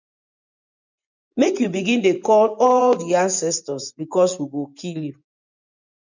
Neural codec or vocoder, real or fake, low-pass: vocoder, 24 kHz, 100 mel bands, Vocos; fake; 7.2 kHz